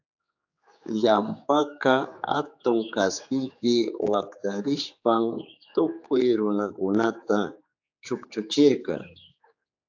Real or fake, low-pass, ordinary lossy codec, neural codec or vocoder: fake; 7.2 kHz; AAC, 48 kbps; codec, 16 kHz, 4 kbps, X-Codec, HuBERT features, trained on general audio